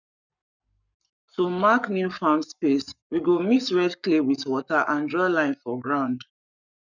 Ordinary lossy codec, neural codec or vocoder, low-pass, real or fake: none; codec, 44.1 kHz, 7.8 kbps, Pupu-Codec; 7.2 kHz; fake